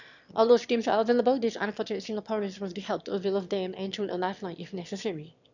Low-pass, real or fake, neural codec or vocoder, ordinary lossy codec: 7.2 kHz; fake; autoencoder, 22.05 kHz, a latent of 192 numbers a frame, VITS, trained on one speaker; none